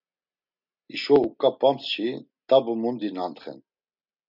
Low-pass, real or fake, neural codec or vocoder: 5.4 kHz; real; none